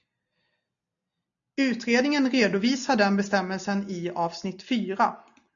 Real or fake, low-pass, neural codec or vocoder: real; 7.2 kHz; none